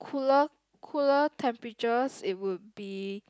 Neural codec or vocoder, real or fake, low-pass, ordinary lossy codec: none; real; none; none